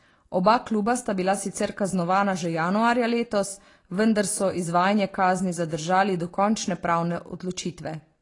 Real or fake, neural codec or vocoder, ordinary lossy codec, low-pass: real; none; AAC, 32 kbps; 10.8 kHz